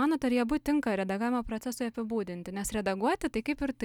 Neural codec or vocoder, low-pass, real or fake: none; 19.8 kHz; real